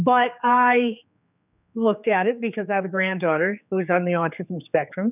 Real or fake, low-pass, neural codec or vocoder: fake; 3.6 kHz; codec, 16 kHz, 4 kbps, X-Codec, HuBERT features, trained on general audio